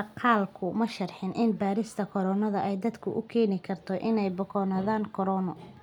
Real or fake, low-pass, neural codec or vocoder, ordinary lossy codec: real; 19.8 kHz; none; none